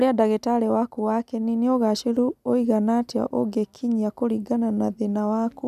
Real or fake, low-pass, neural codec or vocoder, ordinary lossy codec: real; 14.4 kHz; none; none